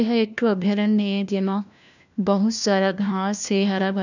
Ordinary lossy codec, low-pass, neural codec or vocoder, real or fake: none; 7.2 kHz; codec, 16 kHz, 1 kbps, FunCodec, trained on LibriTTS, 50 frames a second; fake